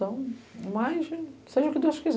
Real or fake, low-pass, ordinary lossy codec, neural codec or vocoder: real; none; none; none